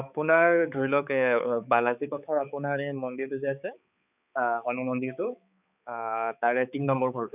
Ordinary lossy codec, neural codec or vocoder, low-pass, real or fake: none; codec, 16 kHz, 4 kbps, X-Codec, HuBERT features, trained on balanced general audio; 3.6 kHz; fake